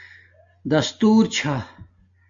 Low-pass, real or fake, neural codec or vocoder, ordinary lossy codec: 7.2 kHz; real; none; AAC, 64 kbps